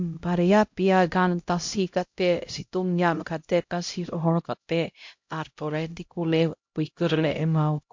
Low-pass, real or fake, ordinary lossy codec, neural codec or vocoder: 7.2 kHz; fake; MP3, 48 kbps; codec, 16 kHz, 0.5 kbps, X-Codec, HuBERT features, trained on LibriSpeech